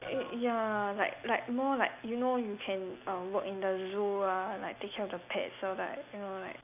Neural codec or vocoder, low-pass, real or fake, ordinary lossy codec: none; 3.6 kHz; real; none